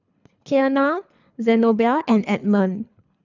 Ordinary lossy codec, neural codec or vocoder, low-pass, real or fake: none; codec, 24 kHz, 3 kbps, HILCodec; 7.2 kHz; fake